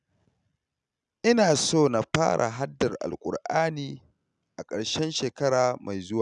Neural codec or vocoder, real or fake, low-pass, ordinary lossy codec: none; real; 10.8 kHz; none